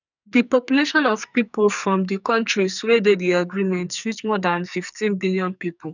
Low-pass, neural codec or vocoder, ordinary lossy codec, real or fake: 7.2 kHz; codec, 44.1 kHz, 2.6 kbps, SNAC; none; fake